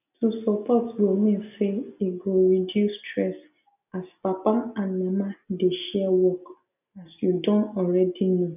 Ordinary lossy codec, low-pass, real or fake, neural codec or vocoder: none; 3.6 kHz; real; none